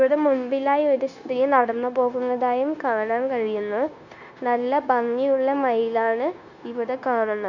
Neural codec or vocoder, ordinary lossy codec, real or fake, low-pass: codec, 16 kHz, 0.9 kbps, LongCat-Audio-Codec; none; fake; 7.2 kHz